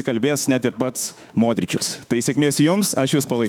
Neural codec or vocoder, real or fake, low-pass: autoencoder, 48 kHz, 32 numbers a frame, DAC-VAE, trained on Japanese speech; fake; 19.8 kHz